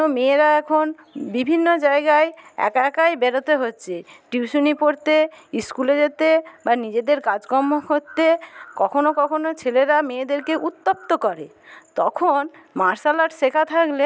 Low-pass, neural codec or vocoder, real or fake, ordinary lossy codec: none; none; real; none